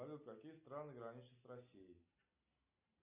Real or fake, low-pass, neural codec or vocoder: real; 3.6 kHz; none